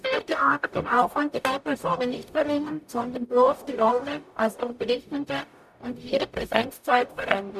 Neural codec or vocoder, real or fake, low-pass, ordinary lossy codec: codec, 44.1 kHz, 0.9 kbps, DAC; fake; 14.4 kHz; none